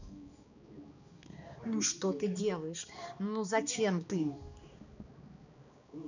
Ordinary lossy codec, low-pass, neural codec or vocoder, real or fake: none; 7.2 kHz; codec, 16 kHz, 2 kbps, X-Codec, HuBERT features, trained on balanced general audio; fake